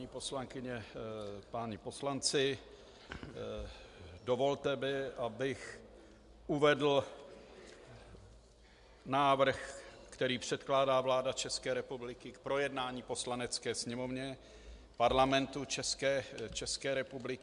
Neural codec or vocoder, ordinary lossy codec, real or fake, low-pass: none; MP3, 64 kbps; real; 10.8 kHz